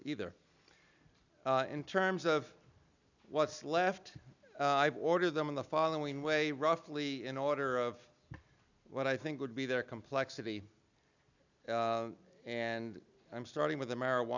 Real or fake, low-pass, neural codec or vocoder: real; 7.2 kHz; none